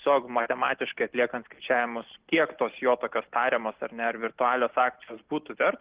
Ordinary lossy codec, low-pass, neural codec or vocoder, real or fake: Opus, 16 kbps; 3.6 kHz; none; real